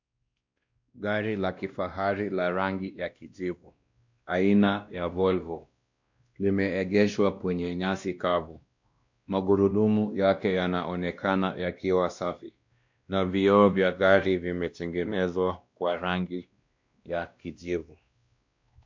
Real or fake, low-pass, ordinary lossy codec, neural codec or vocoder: fake; 7.2 kHz; MP3, 64 kbps; codec, 16 kHz, 1 kbps, X-Codec, WavLM features, trained on Multilingual LibriSpeech